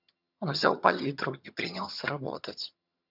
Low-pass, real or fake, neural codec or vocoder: 5.4 kHz; fake; vocoder, 22.05 kHz, 80 mel bands, HiFi-GAN